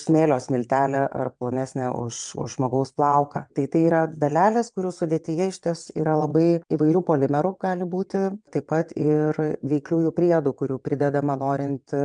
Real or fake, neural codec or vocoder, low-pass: fake; vocoder, 22.05 kHz, 80 mel bands, WaveNeXt; 9.9 kHz